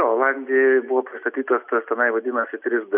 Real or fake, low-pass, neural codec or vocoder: real; 3.6 kHz; none